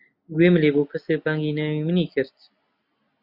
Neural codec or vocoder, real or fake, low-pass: none; real; 5.4 kHz